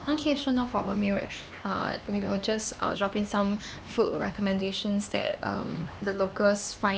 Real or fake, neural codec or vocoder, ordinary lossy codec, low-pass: fake; codec, 16 kHz, 2 kbps, X-Codec, HuBERT features, trained on LibriSpeech; none; none